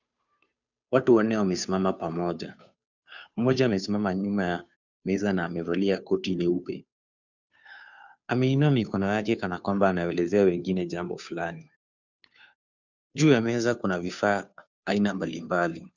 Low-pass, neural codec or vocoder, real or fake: 7.2 kHz; codec, 16 kHz, 2 kbps, FunCodec, trained on Chinese and English, 25 frames a second; fake